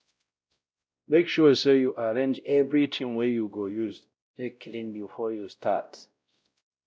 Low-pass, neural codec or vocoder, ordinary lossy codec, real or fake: none; codec, 16 kHz, 0.5 kbps, X-Codec, WavLM features, trained on Multilingual LibriSpeech; none; fake